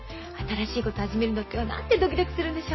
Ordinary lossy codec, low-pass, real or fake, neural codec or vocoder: MP3, 24 kbps; 7.2 kHz; real; none